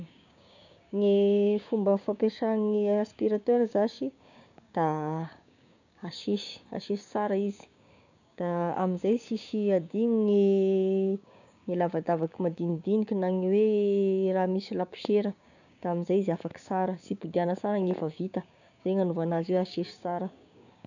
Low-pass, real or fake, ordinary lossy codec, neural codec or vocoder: 7.2 kHz; fake; none; codec, 16 kHz, 6 kbps, DAC